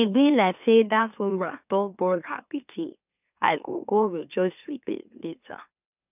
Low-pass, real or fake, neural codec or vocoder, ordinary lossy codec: 3.6 kHz; fake; autoencoder, 44.1 kHz, a latent of 192 numbers a frame, MeloTTS; none